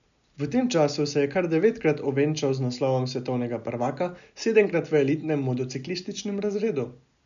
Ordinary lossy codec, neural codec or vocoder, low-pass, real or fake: none; none; 7.2 kHz; real